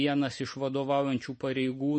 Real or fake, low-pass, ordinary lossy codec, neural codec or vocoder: real; 10.8 kHz; MP3, 32 kbps; none